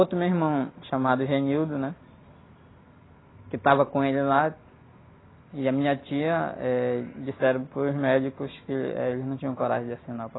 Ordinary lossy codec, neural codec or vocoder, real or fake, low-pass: AAC, 16 kbps; none; real; 7.2 kHz